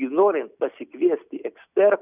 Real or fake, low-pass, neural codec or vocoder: real; 3.6 kHz; none